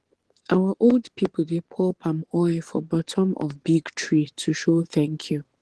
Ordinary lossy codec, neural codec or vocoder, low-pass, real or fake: Opus, 16 kbps; vocoder, 24 kHz, 100 mel bands, Vocos; 10.8 kHz; fake